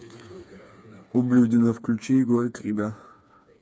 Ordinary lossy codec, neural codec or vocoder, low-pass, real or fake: none; codec, 16 kHz, 4 kbps, FreqCodec, smaller model; none; fake